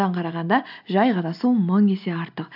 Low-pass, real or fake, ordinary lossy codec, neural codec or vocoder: 5.4 kHz; real; AAC, 48 kbps; none